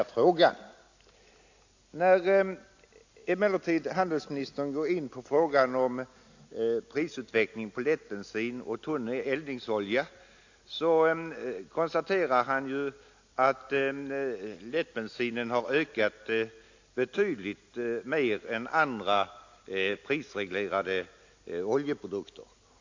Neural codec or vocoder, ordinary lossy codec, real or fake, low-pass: none; AAC, 48 kbps; real; 7.2 kHz